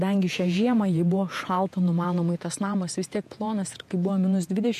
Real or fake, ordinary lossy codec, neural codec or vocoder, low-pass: fake; MP3, 64 kbps; vocoder, 48 kHz, 128 mel bands, Vocos; 14.4 kHz